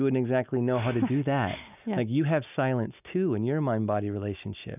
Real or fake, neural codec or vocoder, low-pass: real; none; 3.6 kHz